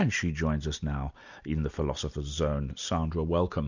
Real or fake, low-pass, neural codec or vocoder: real; 7.2 kHz; none